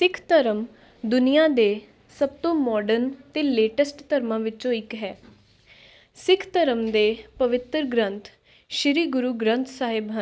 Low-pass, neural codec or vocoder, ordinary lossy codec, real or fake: none; none; none; real